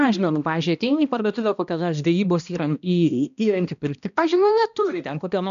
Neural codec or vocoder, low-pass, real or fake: codec, 16 kHz, 1 kbps, X-Codec, HuBERT features, trained on balanced general audio; 7.2 kHz; fake